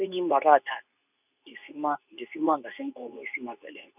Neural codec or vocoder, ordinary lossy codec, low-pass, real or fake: codec, 24 kHz, 0.9 kbps, WavTokenizer, medium speech release version 2; none; 3.6 kHz; fake